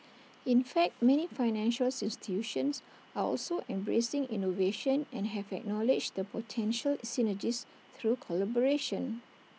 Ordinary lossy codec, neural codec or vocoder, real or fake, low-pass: none; none; real; none